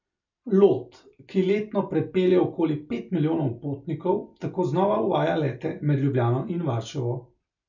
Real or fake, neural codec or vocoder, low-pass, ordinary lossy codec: real; none; 7.2 kHz; none